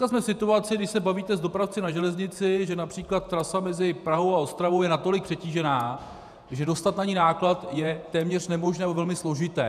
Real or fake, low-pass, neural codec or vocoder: fake; 14.4 kHz; vocoder, 48 kHz, 128 mel bands, Vocos